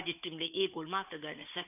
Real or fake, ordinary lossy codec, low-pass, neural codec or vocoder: fake; none; 3.6 kHz; codec, 16 kHz, 4 kbps, FunCodec, trained on Chinese and English, 50 frames a second